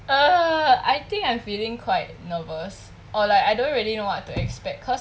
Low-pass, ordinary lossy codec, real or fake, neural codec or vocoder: none; none; real; none